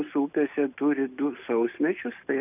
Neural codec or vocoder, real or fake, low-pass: none; real; 3.6 kHz